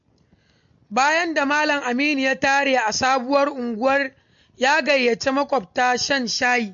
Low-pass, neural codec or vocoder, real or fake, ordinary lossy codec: 7.2 kHz; none; real; MP3, 48 kbps